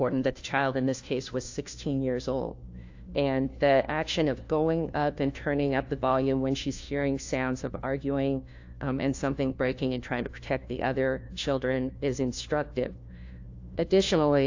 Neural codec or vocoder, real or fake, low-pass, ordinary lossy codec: codec, 16 kHz, 1 kbps, FunCodec, trained on LibriTTS, 50 frames a second; fake; 7.2 kHz; AAC, 48 kbps